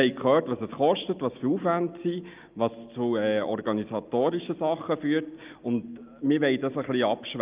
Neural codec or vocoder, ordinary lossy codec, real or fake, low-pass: none; Opus, 32 kbps; real; 3.6 kHz